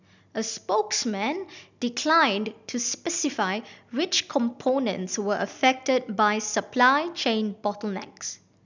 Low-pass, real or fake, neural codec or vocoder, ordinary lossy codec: 7.2 kHz; real; none; none